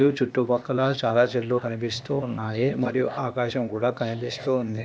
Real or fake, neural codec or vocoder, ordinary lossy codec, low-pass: fake; codec, 16 kHz, 0.8 kbps, ZipCodec; none; none